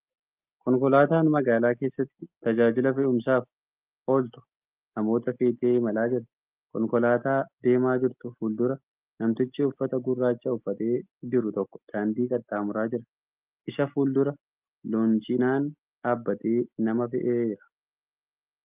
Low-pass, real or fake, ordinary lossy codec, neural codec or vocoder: 3.6 kHz; real; Opus, 24 kbps; none